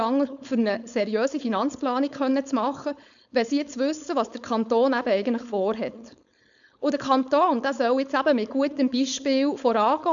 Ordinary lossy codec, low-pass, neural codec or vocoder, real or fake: none; 7.2 kHz; codec, 16 kHz, 4.8 kbps, FACodec; fake